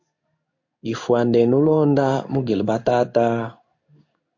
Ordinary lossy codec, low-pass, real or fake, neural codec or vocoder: AAC, 48 kbps; 7.2 kHz; fake; codec, 16 kHz in and 24 kHz out, 1 kbps, XY-Tokenizer